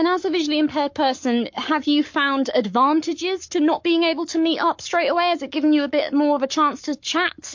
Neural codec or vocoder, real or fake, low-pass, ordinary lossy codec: codec, 44.1 kHz, 7.8 kbps, Pupu-Codec; fake; 7.2 kHz; MP3, 48 kbps